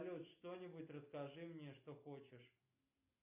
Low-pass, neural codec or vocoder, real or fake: 3.6 kHz; none; real